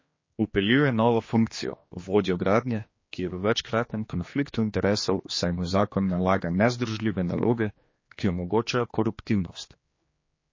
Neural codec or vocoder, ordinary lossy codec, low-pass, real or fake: codec, 16 kHz, 2 kbps, X-Codec, HuBERT features, trained on general audio; MP3, 32 kbps; 7.2 kHz; fake